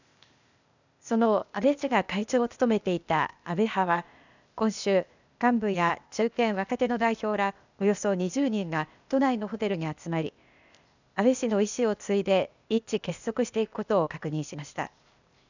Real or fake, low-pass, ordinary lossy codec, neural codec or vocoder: fake; 7.2 kHz; none; codec, 16 kHz, 0.8 kbps, ZipCodec